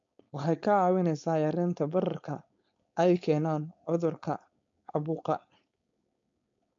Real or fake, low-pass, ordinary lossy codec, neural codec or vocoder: fake; 7.2 kHz; MP3, 48 kbps; codec, 16 kHz, 4.8 kbps, FACodec